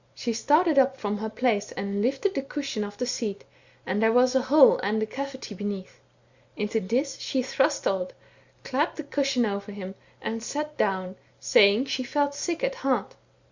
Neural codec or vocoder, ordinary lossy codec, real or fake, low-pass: none; Opus, 64 kbps; real; 7.2 kHz